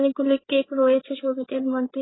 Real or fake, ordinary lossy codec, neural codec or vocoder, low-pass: fake; AAC, 16 kbps; codec, 16 kHz, 4 kbps, FreqCodec, larger model; 7.2 kHz